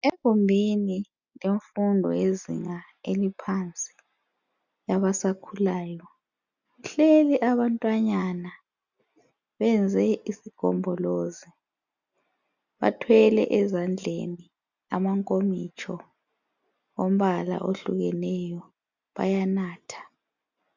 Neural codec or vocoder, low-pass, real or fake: none; 7.2 kHz; real